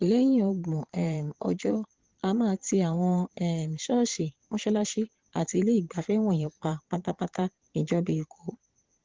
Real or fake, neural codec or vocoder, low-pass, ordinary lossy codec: fake; vocoder, 22.05 kHz, 80 mel bands, Vocos; 7.2 kHz; Opus, 16 kbps